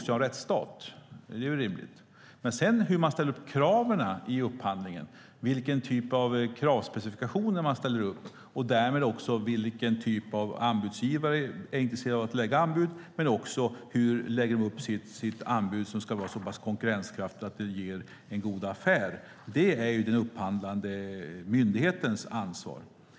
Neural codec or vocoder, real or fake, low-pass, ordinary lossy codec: none; real; none; none